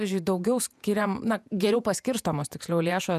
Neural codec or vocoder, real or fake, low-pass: vocoder, 48 kHz, 128 mel bands, Vocos; fake; 14.4 kHz